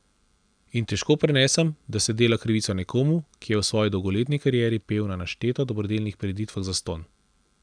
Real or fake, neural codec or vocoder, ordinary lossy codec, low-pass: real; none; none; 9.9 kHz